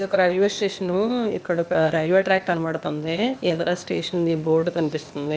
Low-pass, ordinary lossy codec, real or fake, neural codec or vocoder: none; none; fake; codec, 16 kHz, 0.8 kbps, ZipCodec